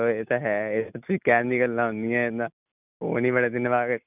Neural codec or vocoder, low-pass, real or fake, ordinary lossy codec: none; 3.6 kHz; real; none